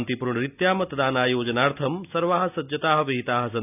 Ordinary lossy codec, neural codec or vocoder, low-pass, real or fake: none; none; 3.6 kHz; real